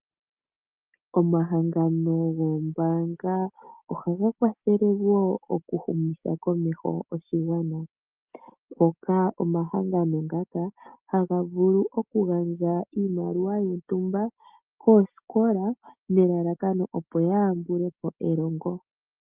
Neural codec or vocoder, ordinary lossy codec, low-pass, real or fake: none; Opus, 32 kbps; 3.6 kHz; real